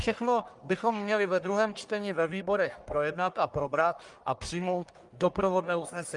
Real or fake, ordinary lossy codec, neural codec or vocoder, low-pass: fake; Opus, 32 kbps; codec, 44.1 kHz, 1.7 kbps, Pupu-Codec; 10.8 kHz